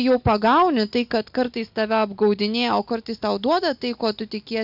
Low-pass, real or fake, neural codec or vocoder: 5.4 kHz; real; none